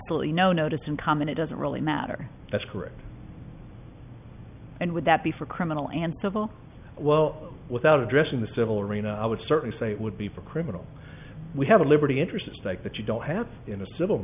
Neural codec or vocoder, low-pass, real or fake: none; 3.6 kHz; real